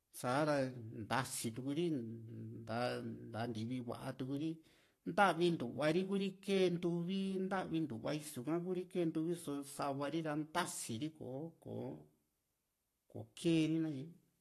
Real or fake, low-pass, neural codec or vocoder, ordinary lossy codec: fake; 14.4 kHz; codec, 44.1 kHz, 7.8 kbps, Pupu-Codec; AAC, 48 kbps